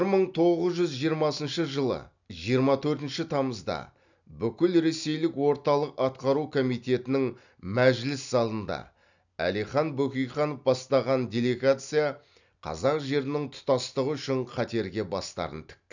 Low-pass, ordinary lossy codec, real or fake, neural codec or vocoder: 7.2 kHz; none; real; none